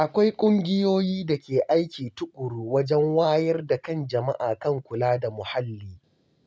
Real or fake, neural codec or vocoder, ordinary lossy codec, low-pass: real; none; none; none